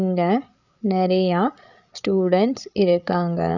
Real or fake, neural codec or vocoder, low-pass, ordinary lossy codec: fake; codec, 16 kHz, 8 kbps, FreqCodec, larger model; 7.2 kHz; none